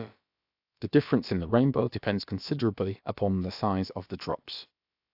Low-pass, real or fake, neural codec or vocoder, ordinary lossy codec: 5.4 kHz; fake; codec, 16 kHz, about 1 kbps, DyCAST, with the encoder's durations; AAC, 48 kbps